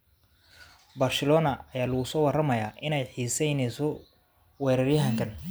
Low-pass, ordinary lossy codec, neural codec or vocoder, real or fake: none; none; none; real